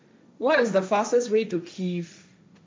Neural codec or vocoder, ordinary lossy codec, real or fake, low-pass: codec, 16 kHz, 1.1 kbps, Voila-Tokenizer; none; fake; none